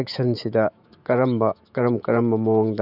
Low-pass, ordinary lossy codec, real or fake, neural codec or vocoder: 5.4 kHz; none; fake; autoencoder, 48 kHz, 128 numbers a frame, DAC-VAE, trained on Japanese speech